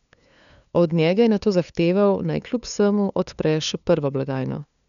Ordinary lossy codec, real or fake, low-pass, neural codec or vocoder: none; fake; 7.2 kHz; codec, 16 kHz, 2 kbps, FunCodec, trained on LibriTTS, 25 frames a second